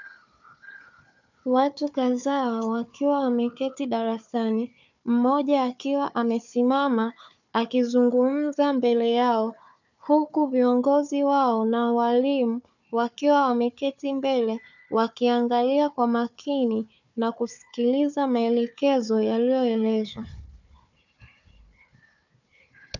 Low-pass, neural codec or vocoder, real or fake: 7.2 kHz; codec, 16 kHz, 4 kbps, FunCodec, trained on Chinese and English, 50 frames a second; fake